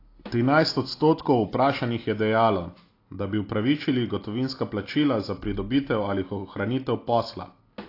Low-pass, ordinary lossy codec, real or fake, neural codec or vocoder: 5.4 kHz; AAC, 32 kbps; real; none